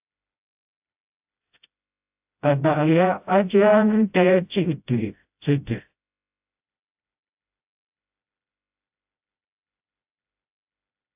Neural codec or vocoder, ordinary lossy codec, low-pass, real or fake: codec, 16 kHz, 0.5 kbps, FreqCodec, smaller model; AAC, 32 kbps; 3.6 kHz; fake